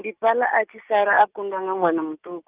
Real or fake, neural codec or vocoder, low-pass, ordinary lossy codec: real; none; 3.6 kHz; Opus, 24 kbps